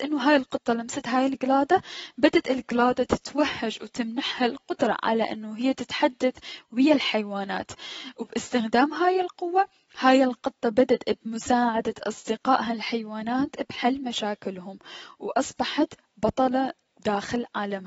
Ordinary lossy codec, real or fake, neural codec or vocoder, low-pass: AAC, 24 kbps; real; none; 10.8 kHz